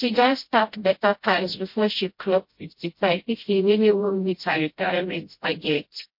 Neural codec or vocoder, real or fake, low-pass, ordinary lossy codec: codec, 16 kHz, 0.5 kbps, FreqCodec, smaller model; fake; 5.4 kHz; MP3, 32 kbps